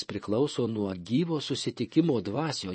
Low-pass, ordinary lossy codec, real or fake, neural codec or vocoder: 10.8 kHz; MP3, 32 kbps; fake; vocoder, 24 kHz, 100 mel bands, Vocos